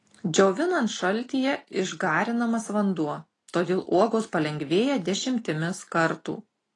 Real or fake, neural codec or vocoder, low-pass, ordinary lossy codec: real; none; 10.8 kHz; AAC, 32 kbps